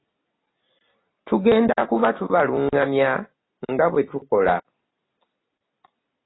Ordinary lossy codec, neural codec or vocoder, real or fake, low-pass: AAC, 16 kbps; none; real; 7.2 kHz